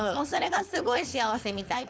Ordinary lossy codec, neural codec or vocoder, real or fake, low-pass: none; codec, 16 kHz, 4.8 kbps, FACodec; fake; none